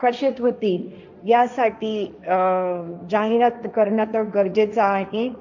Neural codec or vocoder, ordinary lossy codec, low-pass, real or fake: codec, 16 kHz, 1.1 kbps, Voila-Tokenizer; none; 7.2 kHz; fake